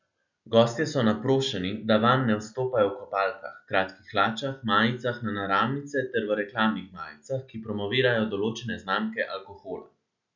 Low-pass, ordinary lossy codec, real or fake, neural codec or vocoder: 7.2 kHz; none; real; none